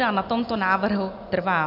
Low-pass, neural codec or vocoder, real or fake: 5.4 kHz; none; real